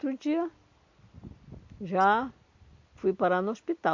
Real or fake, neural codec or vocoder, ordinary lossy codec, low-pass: real; none; MP3, 48 kbps; 7.2 kHz